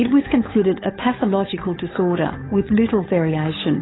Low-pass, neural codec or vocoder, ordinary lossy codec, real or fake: 7.2 kHz; codec, 16 kHz, 8 kbps, FunCodec, trained on Chinese and English, 25 frames a second; AAC, 16 kbps; fake